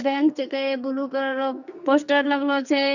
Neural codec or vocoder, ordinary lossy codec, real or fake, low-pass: codec, 44.1 kHz, 2.6 kbps, SNAC; none; fake; 7.2 kHz